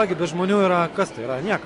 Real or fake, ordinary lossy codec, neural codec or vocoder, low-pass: real; AAC, 48 kbps; none; 10.8 kHz